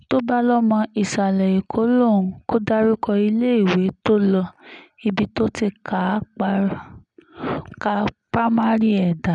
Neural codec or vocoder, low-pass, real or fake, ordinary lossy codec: none; 10.8 kHz; real; none